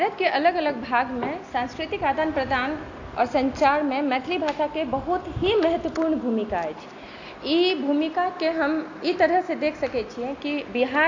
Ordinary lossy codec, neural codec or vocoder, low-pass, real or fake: AAC, 48 kbps; none; 7.2 kHz; real